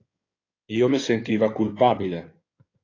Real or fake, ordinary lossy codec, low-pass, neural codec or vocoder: fake; AAC, 32 kbps; 7.2 kHz; codec, 16 kHz in and 24 kHz out, 2.2 kbps, FireRedTTS-2 codec